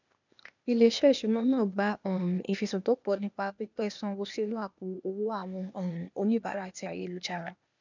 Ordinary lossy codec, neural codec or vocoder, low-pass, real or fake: none; codec, 16 kHz, 0.8 kbps, ZipCodec; 7.2 kHz; fake